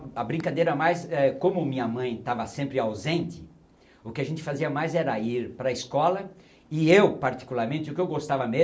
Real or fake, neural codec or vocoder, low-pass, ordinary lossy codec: real; none; none; none